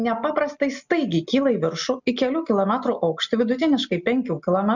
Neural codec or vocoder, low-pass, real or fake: none; 7.2 kHz; real